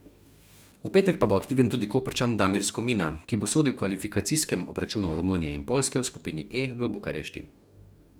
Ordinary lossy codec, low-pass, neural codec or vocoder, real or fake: none; none; codec, 44.1 kHz, 2.6 kbps, DAC; fake